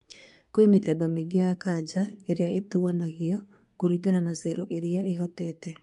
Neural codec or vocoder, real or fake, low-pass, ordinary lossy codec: codec, 24 kHz, 1 kbps, SNAC; fake; 10.8 kHz; none